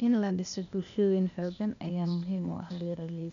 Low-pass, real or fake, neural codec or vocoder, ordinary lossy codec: 7.2 kHz; fake; codec, 16 kHz, 0.8 kbps, ZipCodec; none